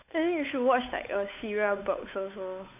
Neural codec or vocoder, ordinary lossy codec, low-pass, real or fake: codec, 16 kHz, 8 kbps, FunCodec, trained on Chinese and English, 25 frames a second; none; 3.6 kHz; fake